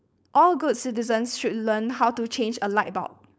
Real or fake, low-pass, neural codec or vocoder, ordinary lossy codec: fake; none; codec, 16 kHz, 4.8 kbps, FACodec; none